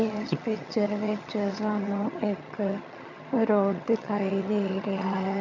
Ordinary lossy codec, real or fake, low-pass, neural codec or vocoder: MP3, 64 kbps; fake; 7.2 kHz; vocoder, 22.05 kHz, 80 mel bands, HiFi-GAN